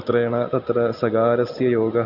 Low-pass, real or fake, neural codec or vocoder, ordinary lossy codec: 5.4 kHz; real; none; none